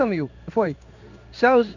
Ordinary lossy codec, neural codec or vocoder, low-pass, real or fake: none; codec, 16 kHz in and 24 kHz out, 1 kbps, XY-Tokenizer; 7.2 kHz; fake